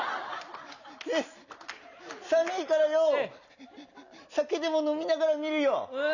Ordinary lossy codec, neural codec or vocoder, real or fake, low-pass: none; none; real; 7.2 kHz